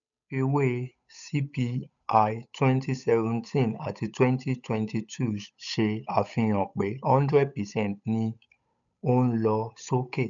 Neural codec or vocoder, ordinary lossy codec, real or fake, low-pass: codec, 16 kHz, 8 kbps, FunCodec, trained on Chinese and English, 25 frames a second; none; fake; 7.2 kHz